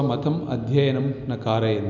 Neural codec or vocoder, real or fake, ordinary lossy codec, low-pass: none; real; none; 7.2 kHz